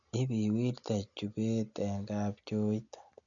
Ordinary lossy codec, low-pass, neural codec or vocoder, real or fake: none; 7.2 kHz; none; real